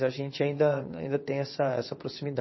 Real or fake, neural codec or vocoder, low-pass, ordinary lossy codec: fake; vocoder, 22.05 kHz, 80 mel bands, WaveNeXt; 7.2 kHz; MP3, 24 kbps